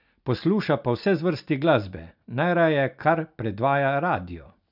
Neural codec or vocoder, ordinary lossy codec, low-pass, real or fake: none; none; 5.4 kHz; real